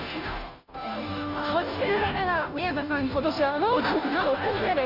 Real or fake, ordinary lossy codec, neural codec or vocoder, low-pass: fake; none; codec, 16 kHz, 0.5 kbps, FunCodec, trained on Chinese and English, 25 frames a second; 5.4 kHz